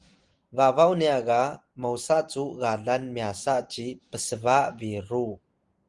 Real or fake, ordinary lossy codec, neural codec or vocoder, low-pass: real; Opus, 24 kbps; none; 10.8 kHz